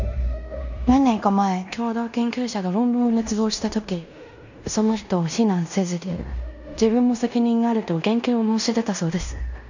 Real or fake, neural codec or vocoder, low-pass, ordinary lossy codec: fake; codec, 16 kHz in and 24 kHz out, 0.9 kbps, LongCat-Audio-Codec, fine tuned four codebook decoder; 7.2 kHz; none